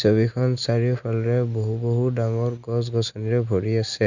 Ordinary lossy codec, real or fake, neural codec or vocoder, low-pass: none; real; none; 7.2 kHz